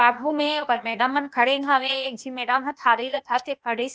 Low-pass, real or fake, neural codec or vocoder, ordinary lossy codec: none; fake; codec, 16 kHz, 0.8 kbps, ZipCodec; none